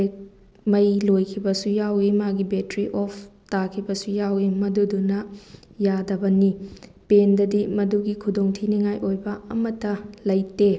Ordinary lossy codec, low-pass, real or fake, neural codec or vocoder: none; none; real; none